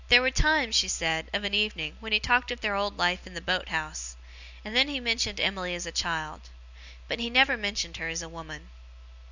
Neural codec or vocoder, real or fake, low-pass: none; real; 7.2 kHz